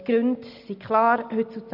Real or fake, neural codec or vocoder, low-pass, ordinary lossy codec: real; none; 5.4 kHz; none